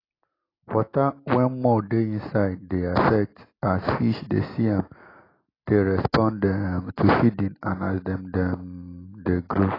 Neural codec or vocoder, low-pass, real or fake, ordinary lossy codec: none; 5.4 kHz; real; AAC, 24 kbps